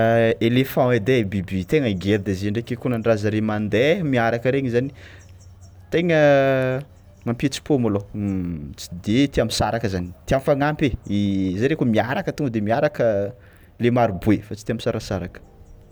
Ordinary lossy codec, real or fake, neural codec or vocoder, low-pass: none; real; none; none